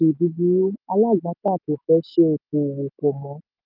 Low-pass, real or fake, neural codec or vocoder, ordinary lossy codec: 5.4 kHz; real; none; none